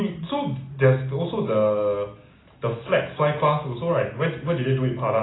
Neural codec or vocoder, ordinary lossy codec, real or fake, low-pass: none; AAC, 16 kbps; real; 7.2 kHz